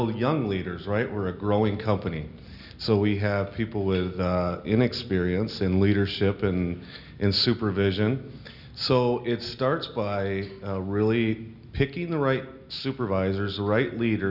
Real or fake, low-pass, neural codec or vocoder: real; 5.4 kHz; none